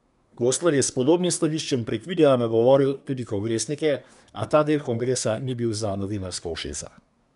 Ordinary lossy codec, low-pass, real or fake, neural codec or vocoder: none; 10.8 kHz; fake; codec, 24 kHz, 1 kbps, SNAC